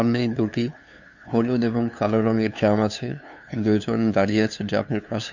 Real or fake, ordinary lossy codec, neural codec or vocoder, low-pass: fake; none; codec, 16 kHz, 2 kbps, FunCodec, trained on LibriTTS, 25 frames a second; 7.2 kHz